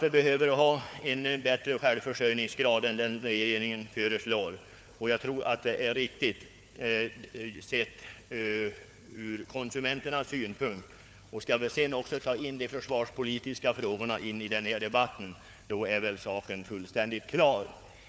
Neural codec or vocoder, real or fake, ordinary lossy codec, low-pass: codec, 16 kHz, 4 kbps, FunCodec, trained on Chinese and English, 50 frames a second; fake; none; none